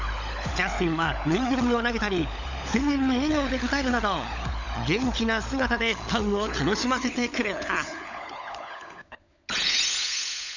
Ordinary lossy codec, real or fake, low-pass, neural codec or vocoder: none; fake; 7.2 kHz; codec, 16 kHz, 16 kbps, FunCodec, trained on LibriTTS, 50 frames a second